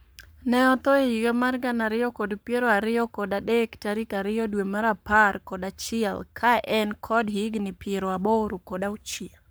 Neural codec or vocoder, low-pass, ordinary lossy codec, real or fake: codec, 44.1 kHz, 7.8 kbps, Pupu-Codec; none; none; fake